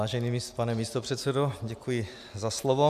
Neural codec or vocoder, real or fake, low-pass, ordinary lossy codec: none; real; 14.4 kHz; AAC, 96 kbps